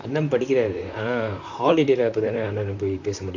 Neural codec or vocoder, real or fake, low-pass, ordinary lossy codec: vocoder, 44.1 kHz, 128 mel bands, Pupu-Vocoder; fake; 7.2 kHz; none